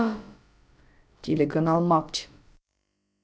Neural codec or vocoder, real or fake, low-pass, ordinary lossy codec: codec, 16 kHz, about 1 kbps, DyCAST, with the encoder's durations; fake; none; none